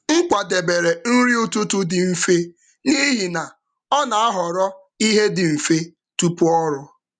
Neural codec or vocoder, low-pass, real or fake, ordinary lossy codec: none; 9.9 kHz; real; MP3, 96 kbps